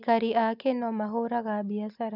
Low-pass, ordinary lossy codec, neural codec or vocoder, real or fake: 5.4 kHz; none; none; real